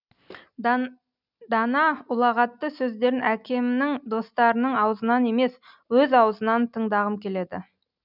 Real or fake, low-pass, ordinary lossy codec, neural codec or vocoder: real; 5.4 kHz; none; none